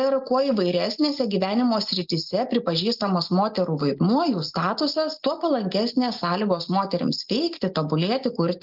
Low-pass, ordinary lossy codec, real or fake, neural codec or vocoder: 5.4 kHz; Opus, 32 kbps; real; none